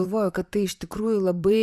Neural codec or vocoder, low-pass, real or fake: vocoder, 44.1 kHz, 128 mel bands, Pupu-Vocoder; 14.4 kHz; fake